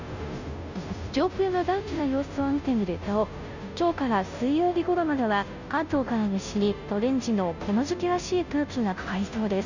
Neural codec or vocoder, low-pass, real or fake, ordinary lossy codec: codec, 16 kHz, 0.5 kbps, FunCodec, trained on Chinese and English, 25 frames a second; 7.2 kHz; fake; none